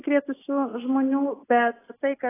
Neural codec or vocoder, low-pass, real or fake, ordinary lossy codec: none; 3.6 kHz; real; AAC, 16 kbps